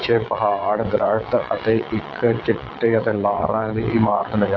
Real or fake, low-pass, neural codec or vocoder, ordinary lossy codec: fake; 7.2 kHz; vocoder, 22.05 kHz, 80 mel bands, WaveNeXt; AAC, 32 kbps